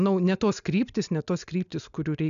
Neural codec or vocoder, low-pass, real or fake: none; 7.2 kHz; real